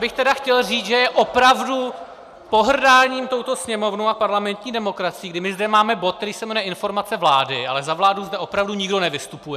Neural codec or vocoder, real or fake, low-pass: none; real; 14.4 kHz